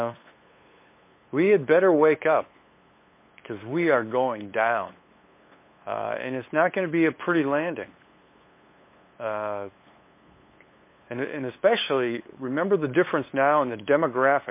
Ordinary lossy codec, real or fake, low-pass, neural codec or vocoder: MP3, 24 kbps; fake; 3.6 kHz; codec, 16 kHz, 8 kbps, FunCodec, trained on LibriTTS, 25 frames a second